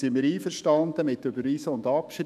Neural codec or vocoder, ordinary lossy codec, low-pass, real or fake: autoencoder, 48 kHz, 128 numbers a frame, DAC-VAE, trained on Japanese speech; none; 14.4 kHz; fake